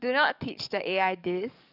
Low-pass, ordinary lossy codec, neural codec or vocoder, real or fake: 5.4 kHz; none; codec, 44.1 kHz, 7.8 kbps, DAC; fake